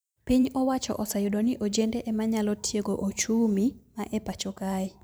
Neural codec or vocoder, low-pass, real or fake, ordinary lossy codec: vocoder, 44.1 kHz, 128 mel bands every 512 samples, BigVGAN v2; none; fake; none